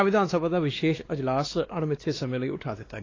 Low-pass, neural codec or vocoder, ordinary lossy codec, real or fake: 7.2 kHz; codec, 16 kHz, 2 kbps, X-Codec, WavLM features, trained on Multilingual LibriSpeech; AAC, 32 kbps; fake